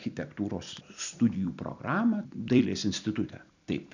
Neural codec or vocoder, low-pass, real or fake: vocoder, 44.1 kHz, 128 mel bands every 256 samples, BigVGAN v2; 7.2 kHz; fake